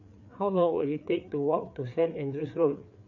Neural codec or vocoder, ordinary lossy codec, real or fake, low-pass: codec, 16 kHz, 4 kbps, FreqCodec, larger model; none; fake; 7.2 kHz